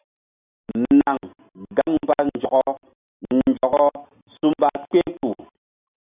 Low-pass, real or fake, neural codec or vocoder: 3.6 kHz; real; none